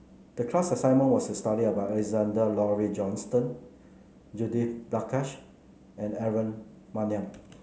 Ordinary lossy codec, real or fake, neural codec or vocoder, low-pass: none; real; none; none